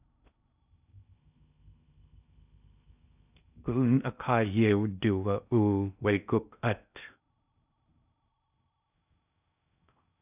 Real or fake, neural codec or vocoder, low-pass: fake; codec, 16 kHz in and 24 kHz out, 0.6 kbps, FocalCodec, streaming, 4096 codes; 3.6 kHz